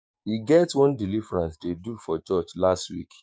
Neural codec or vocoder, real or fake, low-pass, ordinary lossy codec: codec, 16 kHz, 6 kbps, DAC; fake; none; none